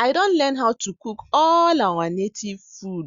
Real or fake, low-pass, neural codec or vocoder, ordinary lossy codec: real; 7.2 kHz; none; Opus, 64 kbps